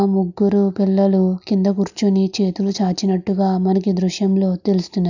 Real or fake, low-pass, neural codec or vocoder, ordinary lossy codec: real; 7.2 kHz; none; none